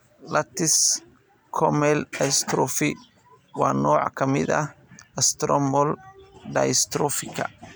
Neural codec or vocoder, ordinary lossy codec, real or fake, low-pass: none; none; real; none